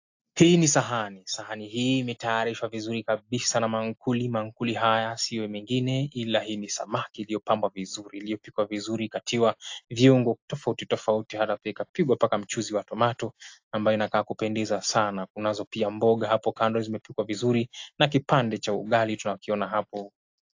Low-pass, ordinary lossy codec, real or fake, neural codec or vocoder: 7.2 kHz; AAC, 48 kbps; real; none